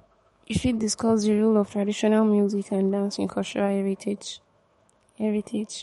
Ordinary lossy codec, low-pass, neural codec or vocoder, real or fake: MP3, 48 kbps; 19.8 kHz; autoencoder, 48 kHz, 128 numbers a frame, DAC-VAE, trained on Japanese speech; fake